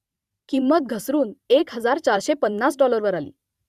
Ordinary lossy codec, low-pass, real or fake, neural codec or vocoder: Opus, 64 kbps; 14.4 kHz; fake; vocoder, 44.1 kHz, 128 mel bands every 512 samples, BigVGAN v2